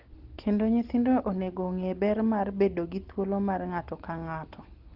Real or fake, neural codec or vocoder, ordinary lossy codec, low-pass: real; none; Opus, 16 kbps; 5.4 kHz